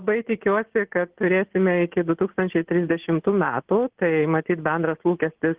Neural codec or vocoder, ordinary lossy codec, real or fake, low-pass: none; Opus, 16 kbps; real; 3.6 kHz